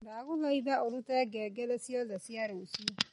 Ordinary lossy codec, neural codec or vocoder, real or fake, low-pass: MP3, 48 kbps; none; real; 19.8 kHz